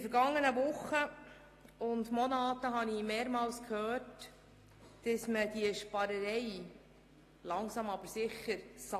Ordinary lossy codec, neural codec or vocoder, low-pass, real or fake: AAC, 48 kbps; none; 14.4 kHz; real